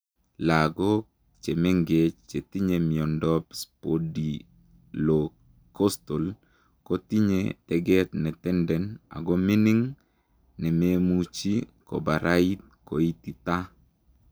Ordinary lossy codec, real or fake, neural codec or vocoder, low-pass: none; real; none; none